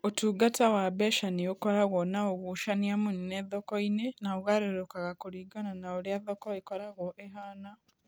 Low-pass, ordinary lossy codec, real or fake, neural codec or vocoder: none; none; real; none